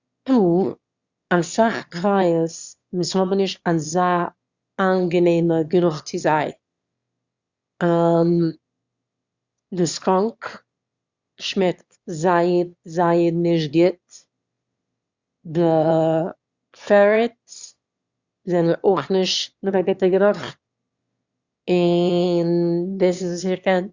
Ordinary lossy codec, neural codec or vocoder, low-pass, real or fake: Opus, 64 kbps; autoencoder, 22.05 kHz, a latent of 192 numbers a frame, VITS, trained on one speaker; 7.2 kHz; fake